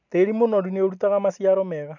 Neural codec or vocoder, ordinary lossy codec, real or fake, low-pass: none; none; real; 7.2 kHz